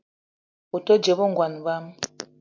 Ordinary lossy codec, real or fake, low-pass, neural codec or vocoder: MP3, 64 kbps; real; 7.2 kHz; none